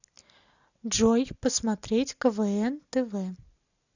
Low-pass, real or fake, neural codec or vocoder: 7.2 kHz; real; none